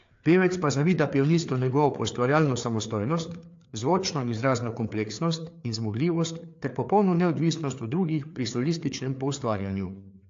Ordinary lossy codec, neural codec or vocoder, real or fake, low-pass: AAC, 48 kbps; codec, 16 kHz, 4 kbps, FreqCodec, larger model; fake; 7.2 kHz